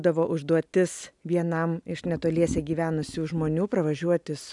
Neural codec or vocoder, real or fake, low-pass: none; real; 10.8 kHz